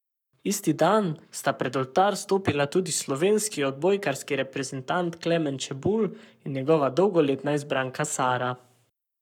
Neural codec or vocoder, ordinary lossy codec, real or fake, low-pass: codec, 44.1 kHz, 7.8 kbps, Pupu-Codec; none; fake; 19.8 kHz